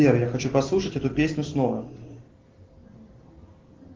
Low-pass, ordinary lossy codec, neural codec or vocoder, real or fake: 7.2 kHz; Opus, 16 kbps; none; real